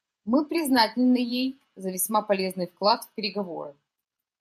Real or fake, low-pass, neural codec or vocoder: fake; 14.4 kHz; vocoder, 48 kHz, 128 mel bands, Vocos